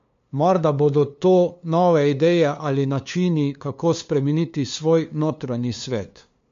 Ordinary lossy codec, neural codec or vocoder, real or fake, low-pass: MP3, 48 kbps; codec, 16 kHz, 2 kbps, FunCodec, trained on LibriTTS, 25 frames a second; fake; 7.2 kHz